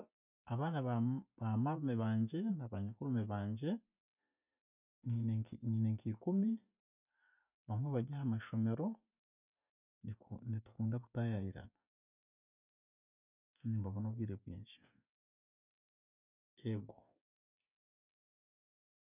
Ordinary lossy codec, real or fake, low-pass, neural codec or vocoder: none; real; 3.6 kHz; none